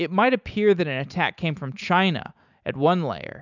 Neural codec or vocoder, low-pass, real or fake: none; 7.2 kHz; real